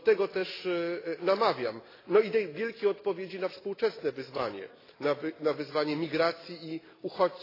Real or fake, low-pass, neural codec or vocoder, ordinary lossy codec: real; 5.4 kHz; none; AAC, 24 kbps